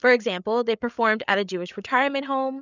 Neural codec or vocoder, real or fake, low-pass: codec, 16 kHz, 8 kbps, FreqCodec, larger model; fake; 7.2 kHz